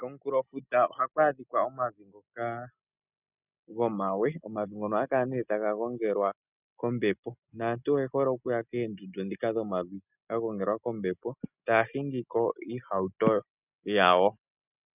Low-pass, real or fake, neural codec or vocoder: 3.6 kHz; real; none